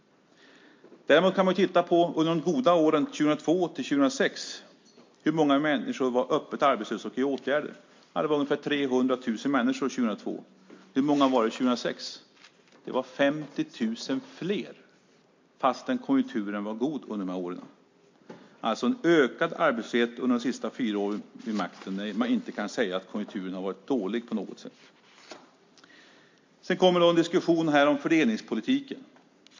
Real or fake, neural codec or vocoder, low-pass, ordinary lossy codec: real; none; 7.2 kHz; MP3, 48 kbps